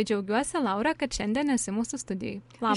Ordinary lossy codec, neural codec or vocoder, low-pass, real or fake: MP3, 64 kbps; none; 10.8 kHz; real